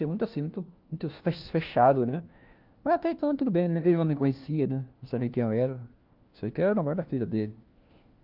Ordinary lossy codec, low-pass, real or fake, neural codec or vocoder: Opus, 32 kbps; 5.4 kHz; fake; codec, 16 kHz, 1 kbps, FunCodec, trained on LibriTTS, 50 frames a second